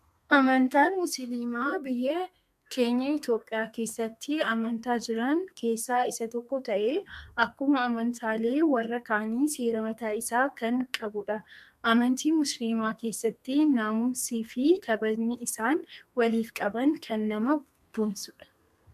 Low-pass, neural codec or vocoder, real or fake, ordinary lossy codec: 14.4 kHz; codec, 32 kHz, 1.9 kbps, SNAC; fake; MP3, 96 kbps